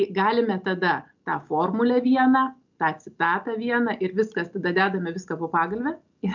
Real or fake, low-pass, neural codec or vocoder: real; 7.2 kHz; none